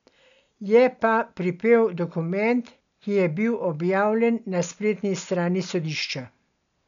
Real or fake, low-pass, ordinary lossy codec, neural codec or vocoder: real; 7.2 kHz; MP3, 96 kbps; none